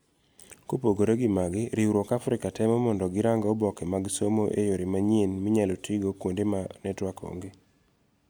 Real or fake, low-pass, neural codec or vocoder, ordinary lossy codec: real; none; none; none